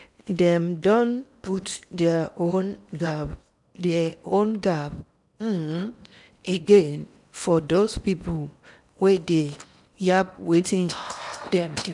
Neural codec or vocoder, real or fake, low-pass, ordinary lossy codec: codec, 16 kHz in and 24 kHz out, 0.8 kbps, FocalCodec, streaming, 65536 codes; fake; 10.8 kHz; none